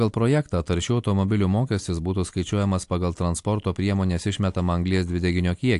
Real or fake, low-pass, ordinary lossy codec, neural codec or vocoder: real; 10.8 kHz; AAC, 64 kbps; none